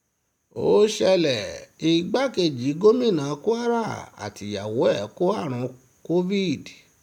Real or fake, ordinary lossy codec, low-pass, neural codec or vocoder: real; none; 19.8 kHz; none